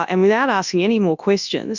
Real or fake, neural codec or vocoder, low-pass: fake; codec, 24 kHz, 0.9 kbps, WavTokenizer, large speech release; 7.2 kHz